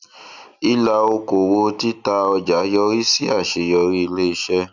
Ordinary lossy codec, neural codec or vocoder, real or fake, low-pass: none; none; real; 7.2 kHz